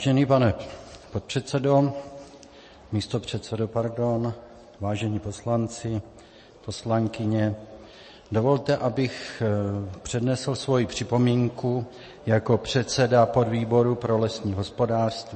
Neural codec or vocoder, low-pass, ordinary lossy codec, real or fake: none; 10.8 kHz; MP3, 32 kbps; real